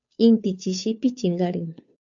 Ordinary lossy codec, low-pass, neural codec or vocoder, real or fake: AAC, 64 kbps; 7.2 kHz; codec, 16 kHz, 2 kbps, FunCodec, trained on Chinese and English, 25 frames a second; fake